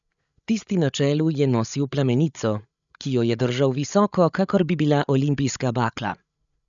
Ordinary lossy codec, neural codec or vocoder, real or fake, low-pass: none; codec, 16 kHz, 8 kbps, FreqCodec, larger model; fake; 7.2 kHz